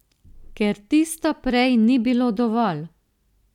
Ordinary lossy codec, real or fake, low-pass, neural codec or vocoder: none; real; 19.8 kHz; none